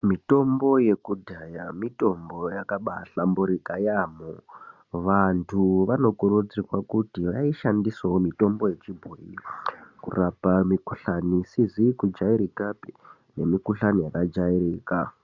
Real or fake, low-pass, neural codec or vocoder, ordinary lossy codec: real; 7.2 kHz; none; Opus, 64 kbps